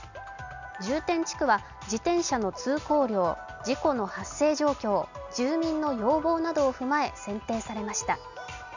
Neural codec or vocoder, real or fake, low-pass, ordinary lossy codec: none; real; 7.2 kHz; none